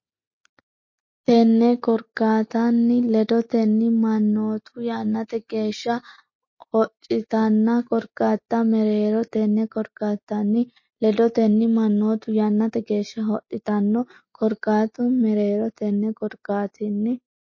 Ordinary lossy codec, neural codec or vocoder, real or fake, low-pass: MP3, 32 kbps; none; real; 7.2 kHz